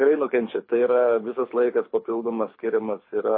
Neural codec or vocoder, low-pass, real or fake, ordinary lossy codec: codec, 24 kHz, 6 kbps, HILCodec; 5.4 kHz; fake; MP3, 24 kbps